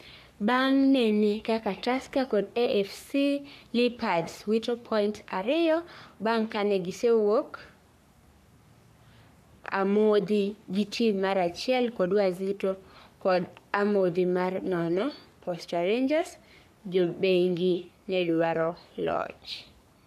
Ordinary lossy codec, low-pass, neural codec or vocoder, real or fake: none; 14.4 kHz; codec, 44.1 kHz, 3.4 kbps, Pupu-Codec; fake